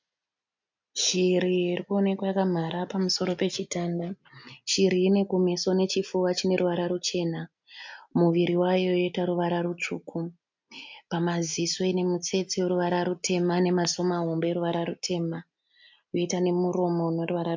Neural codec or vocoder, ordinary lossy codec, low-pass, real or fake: none; MP3, 64 kbps; 7.2 kHz; real